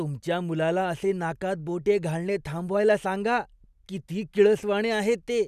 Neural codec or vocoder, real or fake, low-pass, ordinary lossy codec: none; real; 14.4 kHz; none